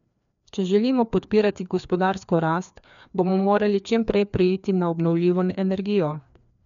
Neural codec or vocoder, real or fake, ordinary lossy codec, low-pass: codec, 16 kHz, 2 kbps, FreqCodec, larger model; fake; none; 7.2 kHz